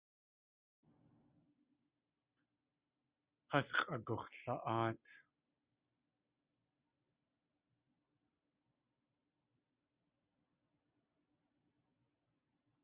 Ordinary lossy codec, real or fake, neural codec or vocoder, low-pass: Opus, 64 kbps; real; none; 3.6 kHz